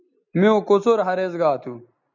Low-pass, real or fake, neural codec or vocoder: 7.2 kHz; real; none